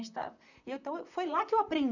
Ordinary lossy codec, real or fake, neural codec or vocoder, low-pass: none; fake; vocoder, 44.1 kHz, 128 mel bands, Pupu-Vocoder; 7.2 kHz